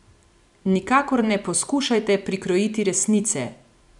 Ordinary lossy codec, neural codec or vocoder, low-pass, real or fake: none; vocoder, 44.1 kHz, 128 mel bands every 256 samples, BigVGAN v2; 10.8 kHz; fake